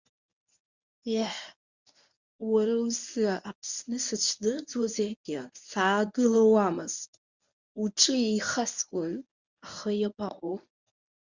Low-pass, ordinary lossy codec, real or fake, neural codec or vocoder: 7.2 kHz; Opus, 64 kbps; fake; codec, 24 kHz, 0.9 kbps, WavTokenizer, medium speech release version 1